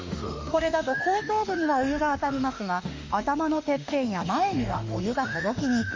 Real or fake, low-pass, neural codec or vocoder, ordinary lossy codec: fake; 7.2 kHz; autoencoder, 48 kHz, 32 numbers a frame, DAC-VAE, trained on Japanese speech; MP3, 48 kbps